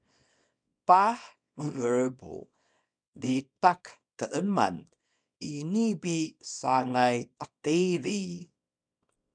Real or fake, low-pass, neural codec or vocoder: fake; 9.9 kHz; codec, 24 kHz, 0.9 kbps, WavTokenizer, small release